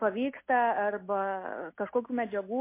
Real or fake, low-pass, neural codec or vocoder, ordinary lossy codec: real; 3.6 kHz; none; MP3, 24 kbps